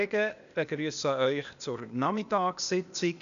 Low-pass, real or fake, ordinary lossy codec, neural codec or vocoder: 7.2 kHz; fake; none; codec, 16 kHz, 0.8 kbps, ZipCodec